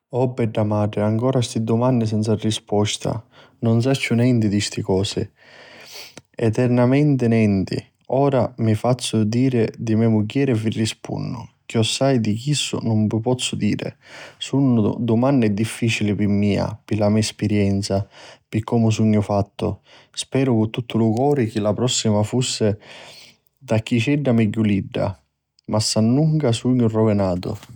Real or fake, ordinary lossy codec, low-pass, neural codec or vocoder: real; none; 19.8 kHz; none